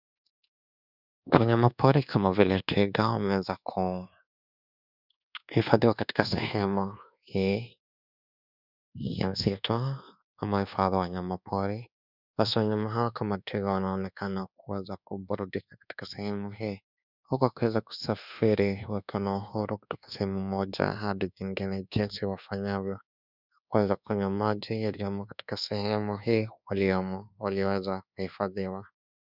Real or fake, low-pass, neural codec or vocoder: fake; 5.4 kHz; codec, 24 kHz, 1.2 kbps, DualCodec